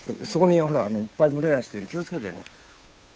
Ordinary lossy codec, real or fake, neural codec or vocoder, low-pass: none; fake; codec, 16 kHz, 2 kbps, FunCodec, trained on Chinese and English, 25 frames a second; none